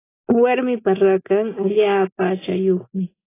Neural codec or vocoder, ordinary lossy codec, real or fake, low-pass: none; AAC, 16 kbps; real; 3.6 kHz